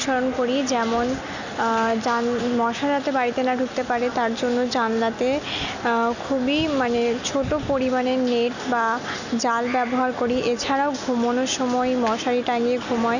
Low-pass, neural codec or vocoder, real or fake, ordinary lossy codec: 7.2 kHz; none; real; none